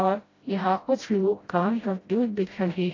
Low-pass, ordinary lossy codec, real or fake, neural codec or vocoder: 7.2 kHz; AAC, 32 kbps; fake; codec, 16 kHz, 0.5 kbps, FreqCodec, smaller model